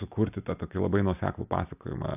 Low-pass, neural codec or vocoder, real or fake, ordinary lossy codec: 3.6 kHz; none; real; AAC, 32 kbps